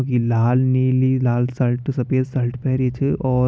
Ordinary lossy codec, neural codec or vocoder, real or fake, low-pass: none; none; real; none